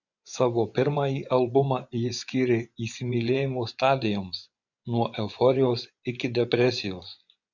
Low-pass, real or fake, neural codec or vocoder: 7.2 kHz; fake; vocoder, 24 kHz, 100 mel bands, Vocos